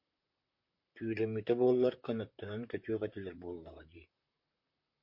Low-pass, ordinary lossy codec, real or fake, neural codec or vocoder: 5.4 kHz; MP3, 32 kbps; fake; vocoder, 44.1 kHz, 128 mel bands, Pupu-Vocoder